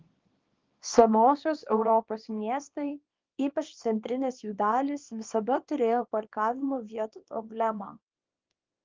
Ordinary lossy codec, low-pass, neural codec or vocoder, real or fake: Opus, 32 kbps; 7.2 kHz; codec, 24 kHz, 0.9 kbps, WavTokenizer, small release; fake